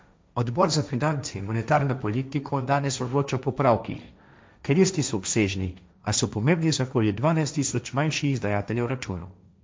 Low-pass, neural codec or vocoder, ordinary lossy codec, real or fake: none; codec, 16 kHz, 1.1 kbps, Voila-Tokenizer; none; fake